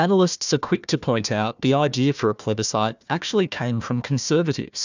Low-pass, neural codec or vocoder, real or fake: 7.2 kHz; codec, 16 kHz, 1 kbps, FunCodec, trained on Chinese and English, 50 frames a second; fake